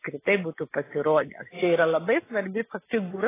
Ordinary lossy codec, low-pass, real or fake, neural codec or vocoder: AAC, 16 kbps; 3.6 kHz; real; none